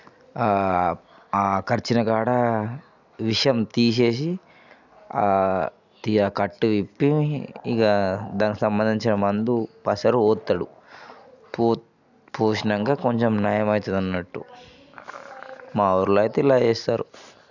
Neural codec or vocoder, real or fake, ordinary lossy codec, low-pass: none; real; none; 7.2 kHz